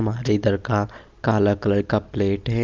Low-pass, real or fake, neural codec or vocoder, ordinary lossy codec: 7.2 kHz; real; none; Opus, 24 kbps